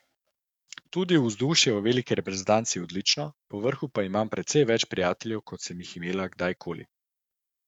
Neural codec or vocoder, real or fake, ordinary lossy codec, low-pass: codec, 44.1 kHz, 7.8 kbps, DAC; fake; none; 19.8 kHz